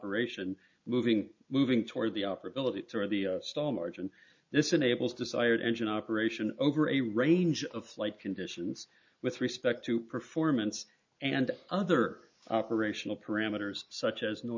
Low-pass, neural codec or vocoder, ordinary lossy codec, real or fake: 7.2 kHz; none; MP3, 64 kbps; real